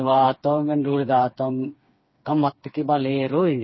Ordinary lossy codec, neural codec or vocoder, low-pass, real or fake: MP3, 24 kbps; codec, 16 kHz, 4 kbps, FreqCodec, smaller model; 7.2 kHz; fake